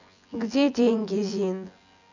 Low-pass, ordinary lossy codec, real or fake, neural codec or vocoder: 7.2 kHz; none; fake; vocoder, 24 kHz, 100 mel bands, Vocos